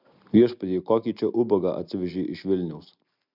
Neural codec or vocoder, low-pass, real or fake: none; 5.4 kHz; real